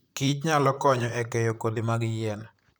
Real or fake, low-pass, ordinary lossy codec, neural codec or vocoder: fake; none; none; vocoder, 44.1 kHz, 128 mel bands, Pupu-Vocoder